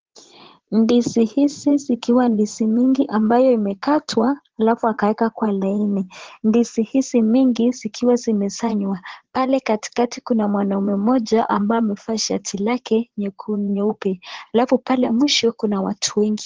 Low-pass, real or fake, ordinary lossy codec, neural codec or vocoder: 7.2 kHz; fake; Opus, 16 kbps; vocoder, 44.1 kHz, 80 mel bands, Vocos